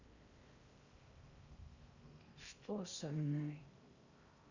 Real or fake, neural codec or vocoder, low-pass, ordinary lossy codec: fake; codec, 16 kHz in and 24 kHz out, 0.6 kbps, FocalCodec, streaming, 4096 codes; 7.2 kHz; Opus, 64 kbps